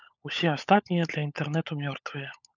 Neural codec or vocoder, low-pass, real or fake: codec, 16 kHz, 16 kbps, FunCodec, trained on LibriTTS, 50 frames a second; 7.2 kHz; fake